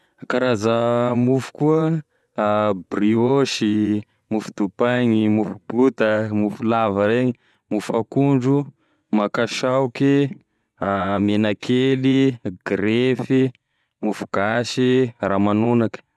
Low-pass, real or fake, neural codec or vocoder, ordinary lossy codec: none; fake; vocoder, 24 kHz, 100 mel bands, Vocos; none